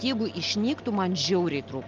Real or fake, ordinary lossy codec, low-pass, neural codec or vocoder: real; Opus, 32 kbps; 7.2 kHz; none